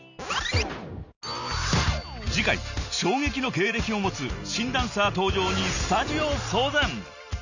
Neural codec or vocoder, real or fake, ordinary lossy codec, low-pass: none; real; none; 7.2 kHz